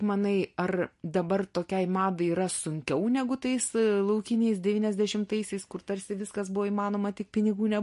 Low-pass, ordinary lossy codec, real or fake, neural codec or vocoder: 14.4 kHz; MP3, 48 kbps; real; none